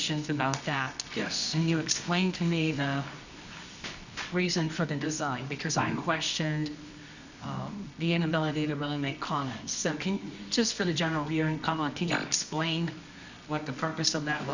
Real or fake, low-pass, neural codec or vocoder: fake; 7.2 kHz; codec, 24 kHz, 0.9 kbps, WavTokenizer, medium music audio release